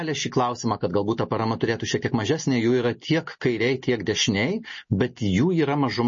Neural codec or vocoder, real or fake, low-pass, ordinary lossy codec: none; real; 7.2 kHz; MP3, 32 kbps